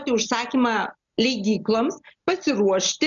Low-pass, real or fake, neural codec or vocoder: 7.2 kHz; real; none